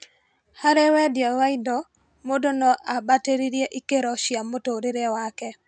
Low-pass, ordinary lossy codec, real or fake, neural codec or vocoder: 9.9 kHz; none; real; none